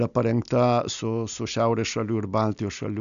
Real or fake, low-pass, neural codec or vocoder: real; 7.2 kHz; none